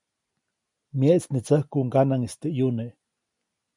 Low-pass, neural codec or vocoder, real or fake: 10.8 kHz; none; real